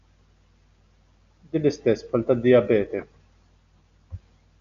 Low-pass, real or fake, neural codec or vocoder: 7.2 kHz; real; none